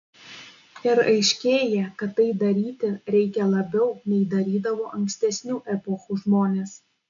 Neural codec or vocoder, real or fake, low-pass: none; real; 7.2 kHz